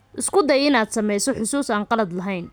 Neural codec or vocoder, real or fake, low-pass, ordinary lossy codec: none; real; none; none